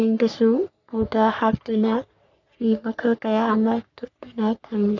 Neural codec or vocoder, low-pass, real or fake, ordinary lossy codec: codec, 44.1 kHz, 3.4 kbps, Pupu-Codec; 7.2 kHz; fake; none